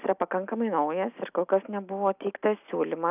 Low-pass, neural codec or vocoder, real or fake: 3.6 kHz; none; real